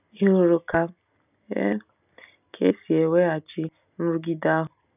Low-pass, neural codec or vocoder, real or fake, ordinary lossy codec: 3.6 kHz; none; real; none